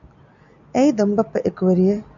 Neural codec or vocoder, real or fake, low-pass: none; real; 7.2 kHz